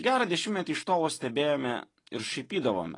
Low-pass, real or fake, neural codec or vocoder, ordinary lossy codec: 10.8 kHz; real; none; AAC, 32 kbps